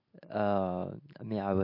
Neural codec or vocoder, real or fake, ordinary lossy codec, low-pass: none; real; MP3, 32 kbps; 5.4 kHz